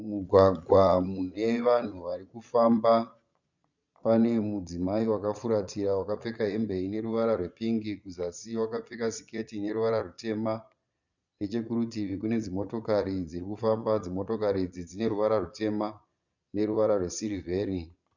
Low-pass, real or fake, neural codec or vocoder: 7.2 kHz; fake; vocoder, 22.05 kHz, 80 mel bands, Vocos